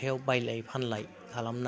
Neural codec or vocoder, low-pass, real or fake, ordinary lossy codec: none; none; real; none